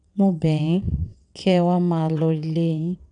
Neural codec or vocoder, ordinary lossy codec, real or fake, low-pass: vocoder, 22.05 kHz, 80 mel bands, WaveNeXt; none; fake; 9.9 kHz